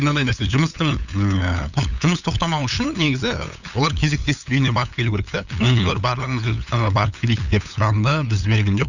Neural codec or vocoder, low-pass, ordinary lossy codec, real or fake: codec, 16 kHz, 8 kbps, FunCodec, trained on LibriTTS, 25 frames a second; 7.2 kHz; none; fake